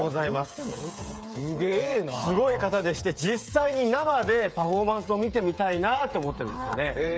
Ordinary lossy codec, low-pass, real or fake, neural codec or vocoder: none; none; fake; codec, 16 kHz, 8 kbps, FreqCodec, smaller model